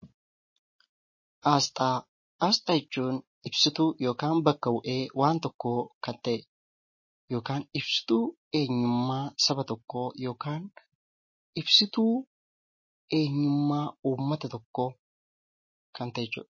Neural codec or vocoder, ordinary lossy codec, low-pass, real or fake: none; MP3, 32 kbps; 7.2 kHz; real